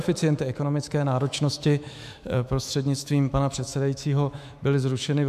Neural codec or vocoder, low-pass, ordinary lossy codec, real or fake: autoencoder, 48 kHz, 128 numbers a frame, DAC-VAE, trained on Japanese speech; 14.4 kHz; AAC, 96 kbps; fake